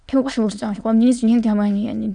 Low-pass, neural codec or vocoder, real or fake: 9.9 kHz; autoencoder, 22.05 kHz, a latent of 192 numbers a frame, VITS, trained on many speakers; fake